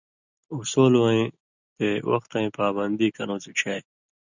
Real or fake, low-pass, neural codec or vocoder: real; 7.2 kHz; none